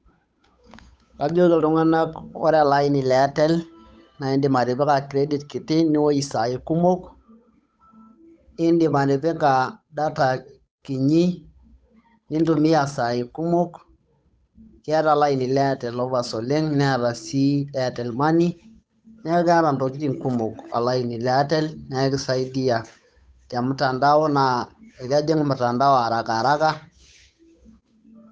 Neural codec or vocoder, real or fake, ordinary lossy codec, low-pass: codec, 16 kHz, 8 kbps, FunCodec, trained on Chinese and English, 25 frames a second; fake; none; none